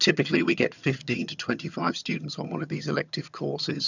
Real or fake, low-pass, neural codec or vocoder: fake; 7.2 kHz; vocoder, 22.05 kHz, 80 mel bands, HiFi-GAN